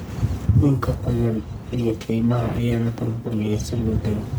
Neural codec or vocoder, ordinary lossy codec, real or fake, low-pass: codec, 44.1 kHz, 1.7 kbps, Pupu-Codec; none; fake; none